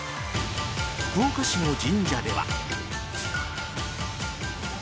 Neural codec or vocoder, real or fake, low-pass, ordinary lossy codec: none; real; none; none